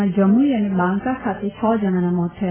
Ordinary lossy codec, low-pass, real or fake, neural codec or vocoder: AAC, 16 kbps; 3.6 kHz; real; none